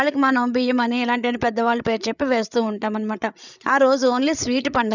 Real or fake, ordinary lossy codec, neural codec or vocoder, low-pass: fake; none; codec, 16 kHz, 8 kbps, FreqCodec, larger model; 7.2 kHz